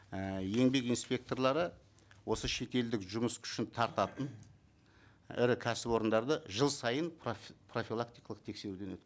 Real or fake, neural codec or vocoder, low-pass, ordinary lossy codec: real; none; none; none